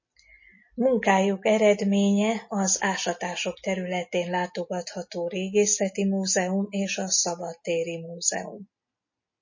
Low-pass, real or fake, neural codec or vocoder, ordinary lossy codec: 7.2 kHz; real; none; MP3, 32 kbps